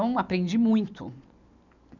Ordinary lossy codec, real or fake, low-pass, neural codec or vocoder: none; real; 7.2 kHz; none